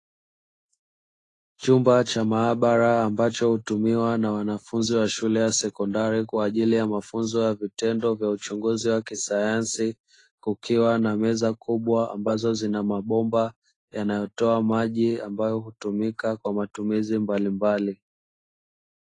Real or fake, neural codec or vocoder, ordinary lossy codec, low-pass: fake; autoencoder, 48 kHz, 128 numbers a frame, DAC-VAE, trained on Japanese speech; AAC, 32 kbps; 10.8 kHz